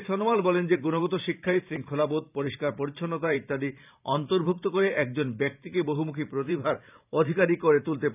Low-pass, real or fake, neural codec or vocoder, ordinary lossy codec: 3.6 kHz; fake; vocoder, 44.1 kHz, 128 mel bands every 512 samples, BigVGAN v2; none